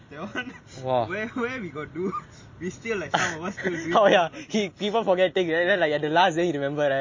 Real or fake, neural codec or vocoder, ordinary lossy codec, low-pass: real; none; MP3, 32 kbps; 7.2 kHz